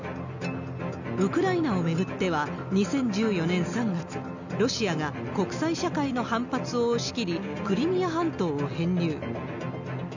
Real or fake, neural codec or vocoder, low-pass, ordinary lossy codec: real; none; 7.2 kHz; none